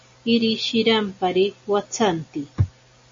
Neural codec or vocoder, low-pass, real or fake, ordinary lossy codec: none; 7.2 kHz; real; MP3, 32 kbps